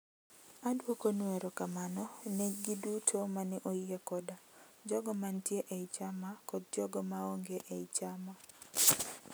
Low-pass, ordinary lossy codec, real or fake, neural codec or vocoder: none; none; real; none